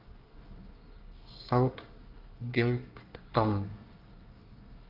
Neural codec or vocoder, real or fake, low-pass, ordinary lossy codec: codec, 24 kHz, 1 kbps, SNAC; fake; 5.4 kHz; Opus, 32 kbps